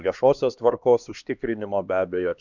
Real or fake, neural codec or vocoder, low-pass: fake; codec, 16 kHz, 2 kbps, X-Codec, HuBERT features, trained on LibriSpeech; 7.2 kHz